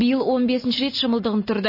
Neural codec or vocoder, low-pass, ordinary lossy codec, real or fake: none; 5.4 kHz; MP3, 24 kbps; real